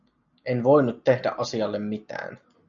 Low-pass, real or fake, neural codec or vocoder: 7.2 kHz; real; none